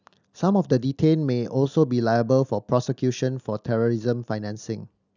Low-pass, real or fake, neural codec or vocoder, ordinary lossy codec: 7.2 kHz; real; none; none